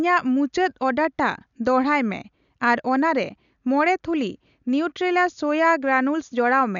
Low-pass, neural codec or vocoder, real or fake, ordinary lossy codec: 7.2 kHz; none; real; none